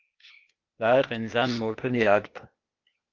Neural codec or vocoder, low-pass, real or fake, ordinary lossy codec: codec, 16 kHz, 0.8 kbps, ZipCodec; 7.2 kHz; fake; Opus, 24 kbps